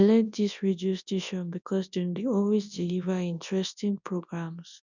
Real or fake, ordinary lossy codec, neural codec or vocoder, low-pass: fake; none; codec, 24 kHz, 0.9 kbps, WavTokenizer, large speech release; 7.2 kHz